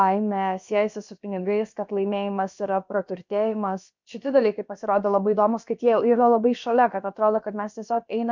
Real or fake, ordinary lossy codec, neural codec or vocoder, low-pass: fake; MP3, 64 kbps; codec, 16 kHz, about 1 kbps, DyCAST, with the encoder's durations; 7.2 kHz